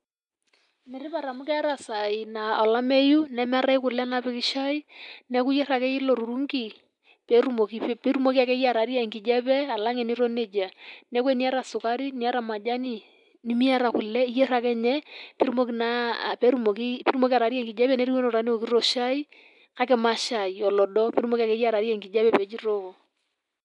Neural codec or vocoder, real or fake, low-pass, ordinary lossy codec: none; real; 10.8 kHz; none